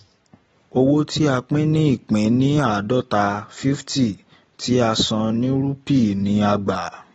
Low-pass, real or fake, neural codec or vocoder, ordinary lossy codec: 19.8 kHz; real; none; AAC, 24 kbps